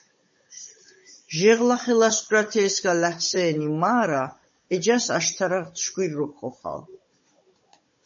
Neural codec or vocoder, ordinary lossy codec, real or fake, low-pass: codec, 16 kHz, 16 kbps, FunCodec, trained on Chinese and English, 50 frames a second; MP3, 32 kbps; fake; 7.2 kHz